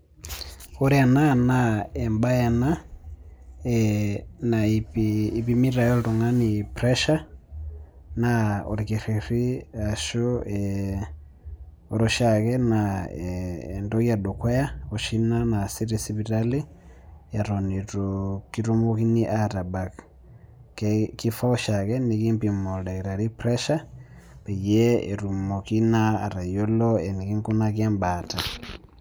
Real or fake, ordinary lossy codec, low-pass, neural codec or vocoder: real; none; none; none